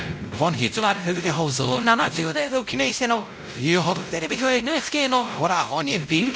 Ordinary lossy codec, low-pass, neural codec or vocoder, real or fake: none; none; codec, 16 kHz, 0.5 kbps, X-Codec, WavLM features, trained on Multilingual LibriSpeech; fake